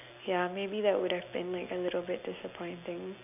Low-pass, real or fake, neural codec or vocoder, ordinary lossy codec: 3.6 kHz; real; none; none